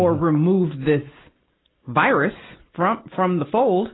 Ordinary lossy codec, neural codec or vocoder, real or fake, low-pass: AAC, 16 kbps; none; real; 7.2 kHz